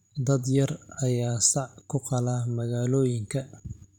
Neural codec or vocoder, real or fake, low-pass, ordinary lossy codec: vocoder, 44.1 kHz, 128 mel bands every 512 samples, BigVGAN v2; fake; 19.8 kHz; none